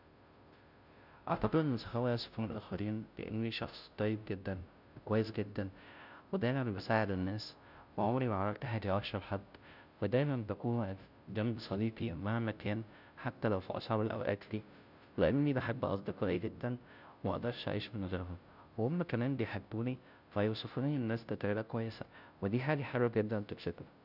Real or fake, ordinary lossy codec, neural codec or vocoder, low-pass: fake; none; codec, 16 kHz, 0.5 kbps, FunCodec, trained on Chinese and English, 25 frames a second; 5.4 kHz